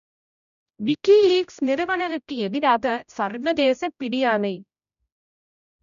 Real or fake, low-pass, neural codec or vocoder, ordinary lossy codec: fake; 7.2 kHz; codec, 16 kHz, 0.5 kbps, X-Codec, HuBERT features, trained on general audio; none